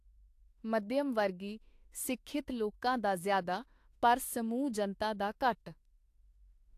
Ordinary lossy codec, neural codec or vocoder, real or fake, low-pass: AAC, 64 kbps; autoencoder, 48 kHz, 32 numbers a frame, DAC-VAE, trained on Japanese speech; fake; 14.4 kHz